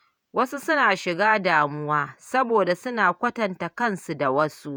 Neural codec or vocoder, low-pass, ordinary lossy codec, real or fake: vocoder, 48 kHz, 128 mel bands, Vocos; none; none; fake